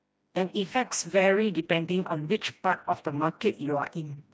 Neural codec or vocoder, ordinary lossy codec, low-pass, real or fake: codec, 16 kHz, 1 kbps, FreqCodec, smaller model; none; none; fake